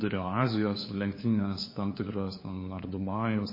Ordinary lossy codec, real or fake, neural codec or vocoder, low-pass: MP3, 24 kbps; fake; codec, 16 kHz, 4 kbps, FunCodec, trained on LibriTTS, 50 frames a second; 5.4 kHz